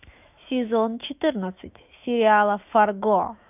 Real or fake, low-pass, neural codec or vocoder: fake; 3.6 kHz; autoencoder, 48 kHz, 128 numbers a frame, DAC-VAE, trained on Japanese speech